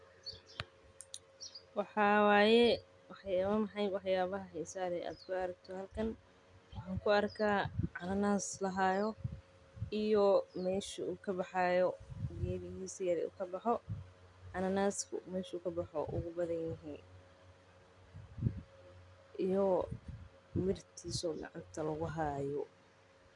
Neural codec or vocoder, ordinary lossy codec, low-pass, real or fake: none; none; 10.8 kHz; real